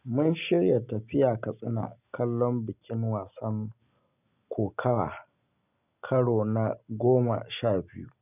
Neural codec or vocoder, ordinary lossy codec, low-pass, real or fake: none; none; 3.6 kHz; real